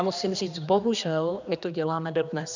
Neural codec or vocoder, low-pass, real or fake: codec, 16 kHz, 2 kbps, X-Codec, HuBERT features, trained on general audio; 7.2 kHz; fake